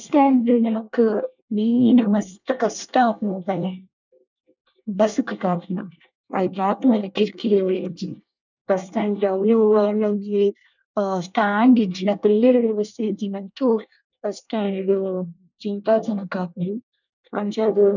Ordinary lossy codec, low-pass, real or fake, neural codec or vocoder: none; 7.2 kHz; fake; codec, 24 kHz, 1 kbps, SNAC